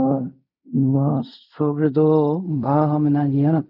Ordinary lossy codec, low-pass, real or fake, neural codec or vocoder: none; 5.4 kHz; fake; codec, 16 kHz in and 24 kHz out, 0.4 kbps, LongCat-Audio-Codec, fine tuned four codebook decoder